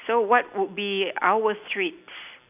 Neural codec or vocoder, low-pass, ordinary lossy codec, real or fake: none; 3.6 kHz; none; real